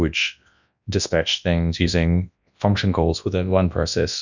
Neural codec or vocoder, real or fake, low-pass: codec, 24 kHz, 0.9 kbps, WavTokenizer, large speech release; fake; 7.2 kHz